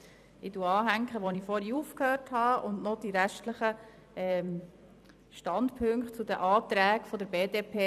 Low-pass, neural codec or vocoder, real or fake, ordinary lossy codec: 14.4 kHz; vocoder, 44.1 kHz, 128 mel bands every 256 samples, BigVGAN v2; fake; none